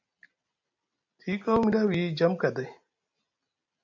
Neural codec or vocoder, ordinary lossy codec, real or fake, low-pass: none; MP3, 64 kbps; real; 7.2 kHz